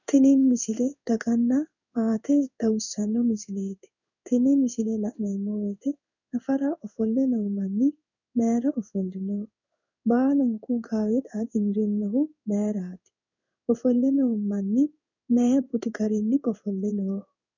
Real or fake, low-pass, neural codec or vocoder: fake; 7.2 kHz; codec, 16 kHz in and 24 kHz out, 1 kbps, XY-Tokenizer